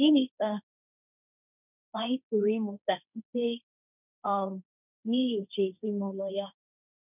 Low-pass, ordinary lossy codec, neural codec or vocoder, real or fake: 3.6 kHz; none; codec, 16 kHz, 1.1 kbps, Voila-Tokenizer; fake